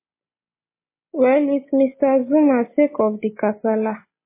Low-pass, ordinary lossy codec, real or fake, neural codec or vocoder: 3.6 kHz; MP3, 16 kbps; real; none